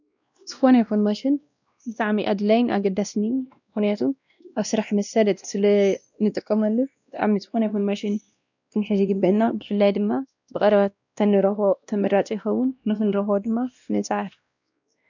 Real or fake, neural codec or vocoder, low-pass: fake; codec, 16 kHz, 1 kbps, X-Codec, WavLM features, trained on Multilingual LibriSpeech; 7.2 kHz